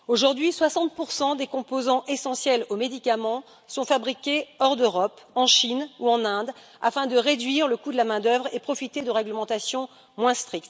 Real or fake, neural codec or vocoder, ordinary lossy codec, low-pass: real; none; none; none